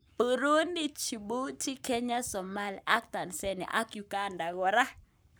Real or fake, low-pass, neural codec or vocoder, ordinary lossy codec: fake; none; codec, 44.1 kHz, 7.8 kbps, Pupu-Codec; none